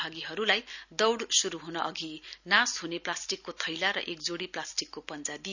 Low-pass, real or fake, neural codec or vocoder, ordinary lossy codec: 7.2 kHz; real; none; none